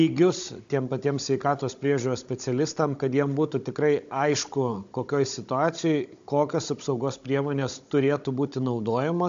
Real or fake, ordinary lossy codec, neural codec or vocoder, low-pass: fake; AAC, 64 kbps; codec, 16 kHz, 16 kbps, FunCodec, trained on Chinese and English, 50 frames a second; 7.2 kHz